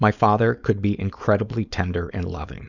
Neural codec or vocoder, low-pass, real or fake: codec, 16 kHz, 4.8 kbps, FACodec; 7.2 kHz; fake